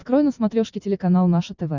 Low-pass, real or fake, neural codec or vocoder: 7.2 kHz; real; none